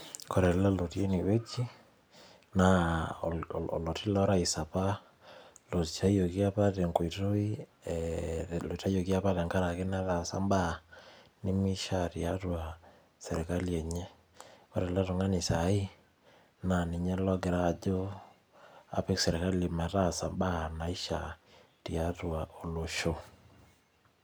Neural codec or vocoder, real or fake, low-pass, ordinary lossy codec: none; real; none; none